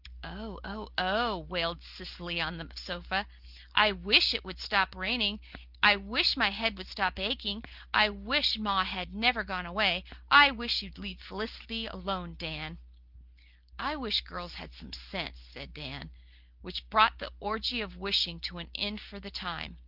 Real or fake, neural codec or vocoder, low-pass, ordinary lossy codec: real; none; 5.4 kHz; Opus, 32 kbps